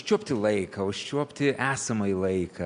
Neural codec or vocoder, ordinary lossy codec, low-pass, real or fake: none; MP3, 64 kbps; 9.9 kHz; real